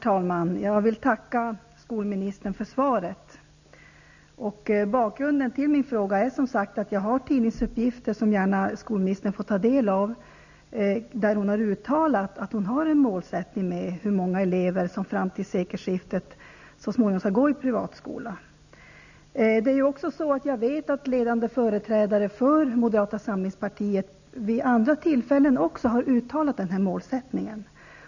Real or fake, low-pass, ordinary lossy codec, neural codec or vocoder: real; 7.2 kHz; none; none